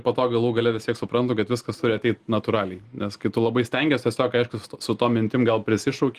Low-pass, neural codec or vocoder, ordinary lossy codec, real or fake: 14.4 kHz; none; Opus, 24 kbps; real